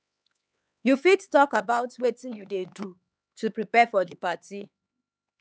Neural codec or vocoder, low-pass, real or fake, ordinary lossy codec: codec, 16 kHz, 4 kbps, X-Codec, HuBERT features, trained on LibriSpeech; none; fake; none